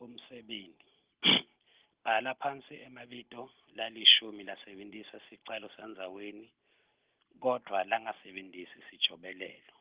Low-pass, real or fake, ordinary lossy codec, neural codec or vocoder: 3.6 kHz; real; Opus, 16 kbps; none